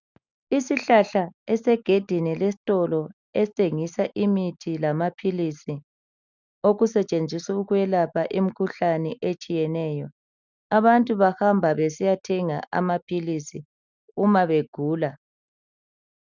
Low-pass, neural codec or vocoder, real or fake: 7.2 kHz; none; real